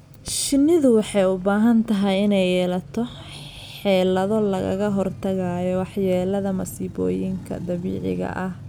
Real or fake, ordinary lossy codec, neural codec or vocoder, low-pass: real; none; none; 19.8 kHz